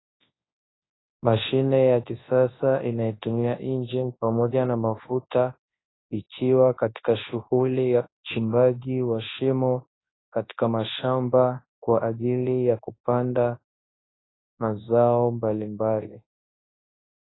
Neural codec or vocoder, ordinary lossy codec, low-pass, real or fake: codec, 24 kHz, 0.9 kbps, WavTokenizer, large speech release; AAC, 16 kbps; 7.2 kHz; fake